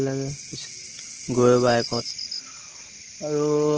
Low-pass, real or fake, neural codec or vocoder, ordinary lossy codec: 7.2 kHz; real; none; Opus, 16 kbps